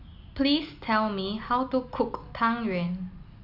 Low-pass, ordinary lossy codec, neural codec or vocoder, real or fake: 5.4 kHz; none; none; real